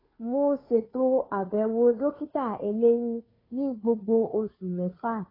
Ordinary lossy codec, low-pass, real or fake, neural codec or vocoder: AAC, 24 kbps; 5.4 kHz; fake; codec, 16 kHz, 2 kbps, FunCodec, trained on Chinese and English, 25 frames a second